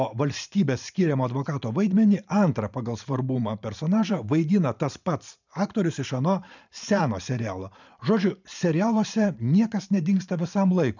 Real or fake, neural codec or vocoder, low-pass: fake; vocoder, 44.1 kHz, 128 mel bands every 512 samples, BigVGAN v2; 7.2 kHz